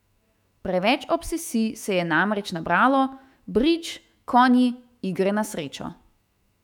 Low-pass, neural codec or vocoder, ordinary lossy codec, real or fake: 19.8 kHz; autoencoder, 48 kHz, 128 numbers a frame, DAC-VAE, trained on Japanese speech; none; fake